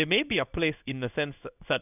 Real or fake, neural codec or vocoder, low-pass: fake; codec, 16 kHz in and 24 kHz out, 1 kbps, XY-Tokenizer; 3.6 kHz